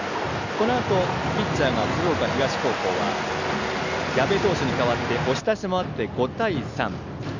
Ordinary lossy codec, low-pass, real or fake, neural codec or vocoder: none; 7.2 kHz; real; none